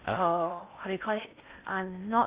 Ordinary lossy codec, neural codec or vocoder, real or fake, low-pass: Opus, 64 kbps; codec, 16 kHz in and 24 kHz out, 0.6 kbps, FocalCodec, streaming, 4096 codes; fake; 3.6 kHz